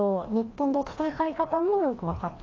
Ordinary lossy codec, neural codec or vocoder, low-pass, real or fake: AAC, 32 kbps; codec, 16 kHz, 1 kbps, FreqCodec, larger model; 7.2 kHz; fake